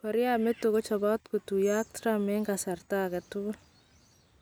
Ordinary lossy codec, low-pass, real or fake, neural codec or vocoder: none; none; real; none